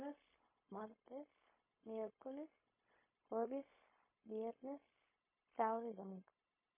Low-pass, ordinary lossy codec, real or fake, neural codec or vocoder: 3.6 kHz; MP3, 16 kbps; fake; codec, 16 kHz, 0.4 kbps, LongCat-Audio-Codec